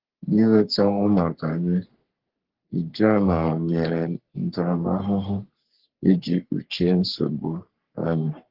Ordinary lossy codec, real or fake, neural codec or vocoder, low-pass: Opus, 16 kbps; fake; codec, 44.1 kHz, 3.4 kbps, Pupu-Codec; 5.4 kHz